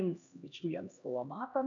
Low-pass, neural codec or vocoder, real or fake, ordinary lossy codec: 7.2 kHz; codec, 16 kHz, 1 kbps, X-Codec, HuBERT features, trained on LibriSpeech; fake; MP3, 96 kbps